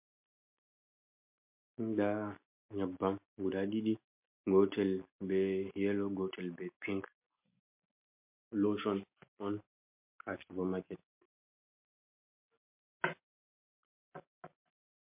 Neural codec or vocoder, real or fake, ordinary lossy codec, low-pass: none; real; MP3, 32 kbps; 3.6 kHz